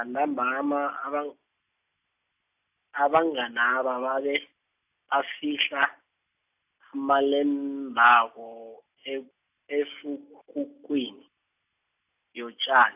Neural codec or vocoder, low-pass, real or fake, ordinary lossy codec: none; 3.6 kHz; real; AAC, 32 kbps